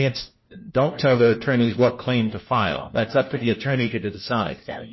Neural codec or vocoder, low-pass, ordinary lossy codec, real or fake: codec, 16 kHz, 1 kbps, FunCodec, trained on LibriTTS, 50 frames a second; 7.2 kHz; MP3, 24 kbps; fake